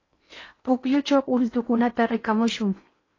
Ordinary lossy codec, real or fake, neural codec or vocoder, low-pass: AAC, 32 kbps; fake; codec, 16 kHz in and 24 kHz out, 0.8 kbps, FocalCodec, streaming, 65536 codes; 7.2 kHz